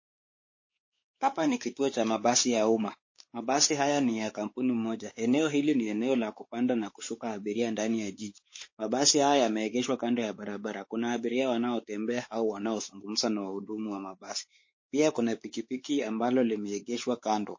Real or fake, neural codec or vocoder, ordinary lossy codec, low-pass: fake; codec, 16 kHz, 4 kbps, X-Codec, WavLM features, trained on Multilingual LibriSpeech; MP3, 32 kbps; 7.2 kHz